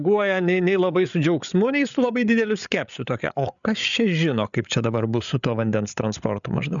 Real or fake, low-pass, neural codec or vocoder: fake; 7.2 kHz; codec, 16 kHz, 8 kbps, FreqCodec, larger model